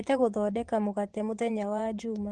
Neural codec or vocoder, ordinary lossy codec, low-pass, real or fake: vocoder, 24 kHz, 100 mel bands, Vocos; Opus, 16 kbps; 10.8 kHz; fake